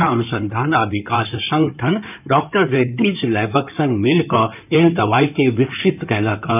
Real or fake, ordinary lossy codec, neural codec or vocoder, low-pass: fake; none; codec, 16 kHz in and 24 kHz out, 2.2 kbps, FireRedTTS-2 codec; 3.6 kHz